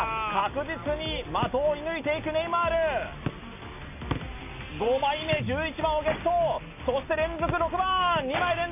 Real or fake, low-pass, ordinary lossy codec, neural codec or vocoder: real; 3.6 kHz; none; none